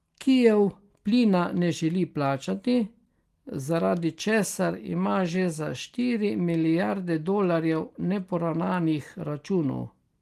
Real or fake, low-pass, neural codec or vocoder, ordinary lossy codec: real; 14.4 kHz; none; Opus, 24 kbps